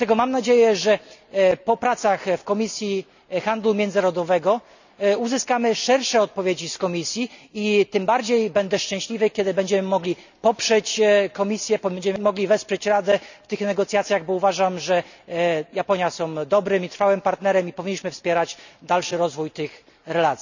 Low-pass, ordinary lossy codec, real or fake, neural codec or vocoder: 7.2 kHz; none; real; none